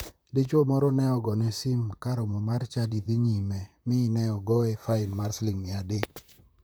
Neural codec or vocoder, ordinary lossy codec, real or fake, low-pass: vocoder, 44.1 kHz, 128 mel bands, Pupu-Vocoder; none; fake; none